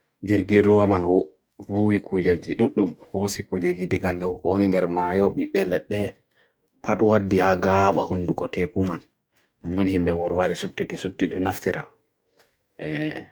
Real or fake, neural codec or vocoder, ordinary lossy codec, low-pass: fake; codec, 44.1 kHz, 2.6 kbps, DAC; none; none